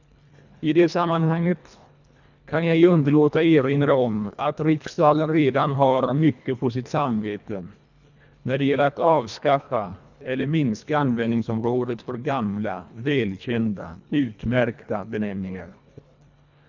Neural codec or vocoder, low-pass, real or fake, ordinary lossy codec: codec, 24 kHz, 1.5 kbps, HILCodec; 7.2 kHz; fake; none